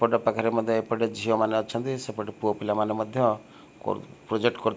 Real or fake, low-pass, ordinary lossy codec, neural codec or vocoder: real; none; none; none